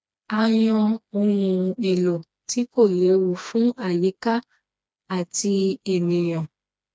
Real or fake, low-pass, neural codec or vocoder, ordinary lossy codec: fake; none; codec, 16 kHz, 2 kbps, FreqCodec, smaller model; none